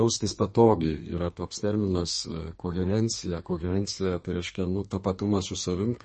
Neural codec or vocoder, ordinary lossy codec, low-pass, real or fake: codec, 32 kHz, 1.9 kbps, SNAC; MP3, 32 kbps; 10.8 kHz; fake